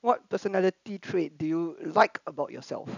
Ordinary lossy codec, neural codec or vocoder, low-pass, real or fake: none; none; 7.2 kHz; real